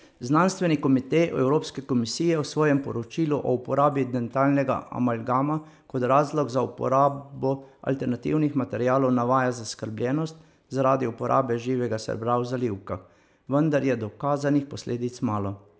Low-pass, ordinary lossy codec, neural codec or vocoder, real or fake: none; none; none; real